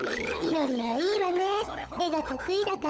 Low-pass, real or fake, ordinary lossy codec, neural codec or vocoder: none; fake; none; codec, 16 kHz, 16 kbps, FunCodec, trained on LibriTTS, 50 frames a second